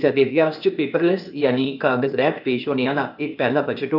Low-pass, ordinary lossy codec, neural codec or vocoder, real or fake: 5.4 kHz; none; codec, 16 kHz, 0.8 kbps, ZipCodec; fake